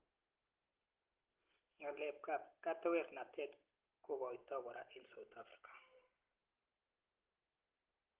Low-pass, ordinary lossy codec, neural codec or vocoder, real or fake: 3.6 kHz; Opus, 16 kbps; none; real